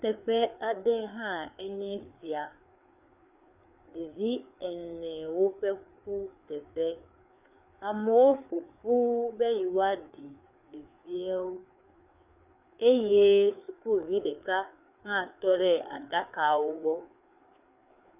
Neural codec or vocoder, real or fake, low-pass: codec, 16 kHz, 4 kbps, FreqCodec, larger model; fake; 3.6 kHz